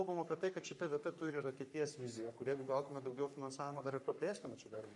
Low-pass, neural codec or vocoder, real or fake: 10.8 kHz; codec, 44.1 kHz, 3.4 kbps, Pupu-Codec; fake